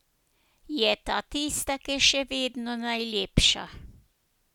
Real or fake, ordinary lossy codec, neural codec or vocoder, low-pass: real; none; none; 19.8 kHz